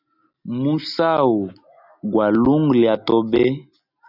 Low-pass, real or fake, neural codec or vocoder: 5.4 kHz; real; none